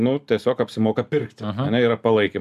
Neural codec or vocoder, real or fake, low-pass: none; real; 14.4 kHz